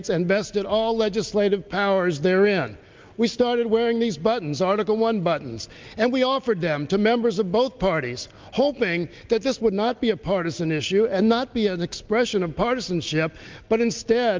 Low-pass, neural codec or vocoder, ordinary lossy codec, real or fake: 7.2 kHz; none; Opus, 24 kbps; real